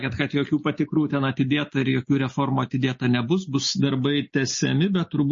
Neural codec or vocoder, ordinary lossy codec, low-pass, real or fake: none; MP3, 32 kbps; 7.2 kHz; real